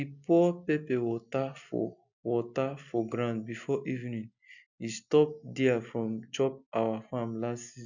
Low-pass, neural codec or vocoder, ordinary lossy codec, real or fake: 7.2 kHz; none; none; real